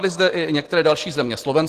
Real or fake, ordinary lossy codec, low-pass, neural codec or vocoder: real; Opus, 16 kbps; 14.4 kHz; none